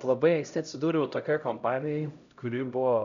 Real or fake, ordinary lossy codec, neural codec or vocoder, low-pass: fake; MP3, 96 kbps; codec, 16 kHz, 0.5 kbps, X-Codec, HuBERT features, trained on LibriSpeech; 7.2 kHz